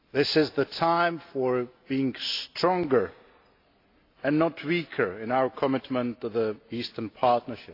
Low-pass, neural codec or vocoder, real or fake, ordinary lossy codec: 5.4 kHz; none; real; AAC, 32 kbps